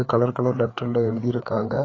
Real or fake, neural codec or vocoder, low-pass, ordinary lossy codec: fake; codec, 16 kHz, 4 kbps, FreqCodec, larger model; 7.2 kHz; AAC, 32 kbps